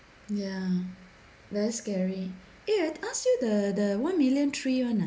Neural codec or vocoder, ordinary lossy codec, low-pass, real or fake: none; none; none; real